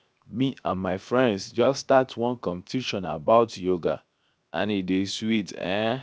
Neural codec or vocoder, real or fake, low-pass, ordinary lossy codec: codec, 16 kHz, 0.7 kbps, FocalCodec; fake; none; none